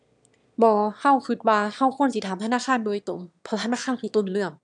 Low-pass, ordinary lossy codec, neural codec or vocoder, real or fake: 9.9 kHz; none; autoencoder, 22.05 kHz, a latent of 192 numbers a frame, VITS, trained on one speaker; fake